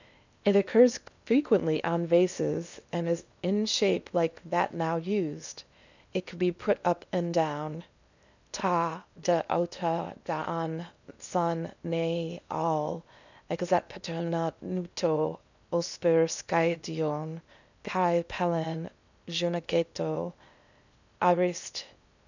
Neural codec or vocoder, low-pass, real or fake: codec, 16 kHz in and 24 kHz out, 0.8 kbps, FocalCodec, streaming, 65536 codes; 7.2 kHz; fake